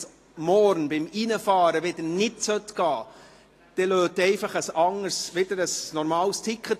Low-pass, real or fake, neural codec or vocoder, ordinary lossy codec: 14.4 kHz; real; none; AAC, 48 kbps